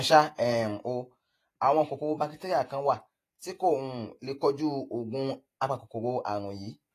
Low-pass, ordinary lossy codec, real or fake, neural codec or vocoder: 14.4 kHz; AAC, 48 kbps; fake; vocoder, 48 kHz, 128 mel bands, Vocos